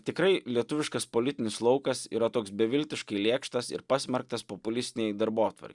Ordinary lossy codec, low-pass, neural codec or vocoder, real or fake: Opus, 64 kbps; 10.8 kHz; none; real